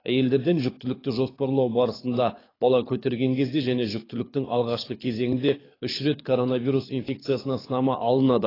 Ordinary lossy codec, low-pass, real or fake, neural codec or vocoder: AAC, 24 kbps; 5.4 kHz; fake; codec, 24 kHz, 6 kbps, HILCodec